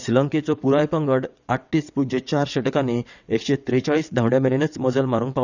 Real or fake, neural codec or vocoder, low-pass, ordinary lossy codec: fake; vocoder, 22.05 kHz, 80 mel bands, WaveNeXt; 7.2 kHz; none